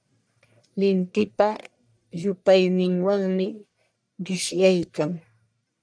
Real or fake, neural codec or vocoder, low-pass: fake; codec, 44.1 kHz, 1.7 kbps, Pupu-Codec; 9.9 kHz